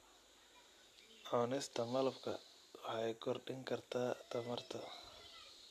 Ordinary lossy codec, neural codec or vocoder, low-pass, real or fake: AAC, 64 kbps; none; 14.4 kHz; real